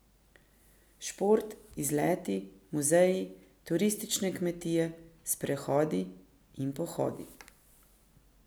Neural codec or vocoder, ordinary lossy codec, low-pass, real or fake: none; none; none; real